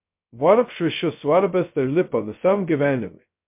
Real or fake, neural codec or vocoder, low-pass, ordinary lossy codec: fake; codec, 16 kHz, 0.2 kbps, FocalCodec; 3.6 kHz; MP3, 32 kbps